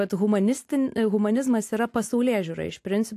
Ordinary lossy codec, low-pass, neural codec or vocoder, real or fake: AAC, 64 kbps; 14.4 kHz; none; real